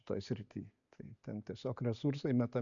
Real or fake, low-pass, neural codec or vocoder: real; 7.2 kHz; none